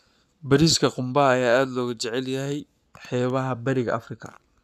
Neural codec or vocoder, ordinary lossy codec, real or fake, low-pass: codec, 44.1 kHz, 7.8 kbps, Pupu-Codec; none; fake; 14.4 kHz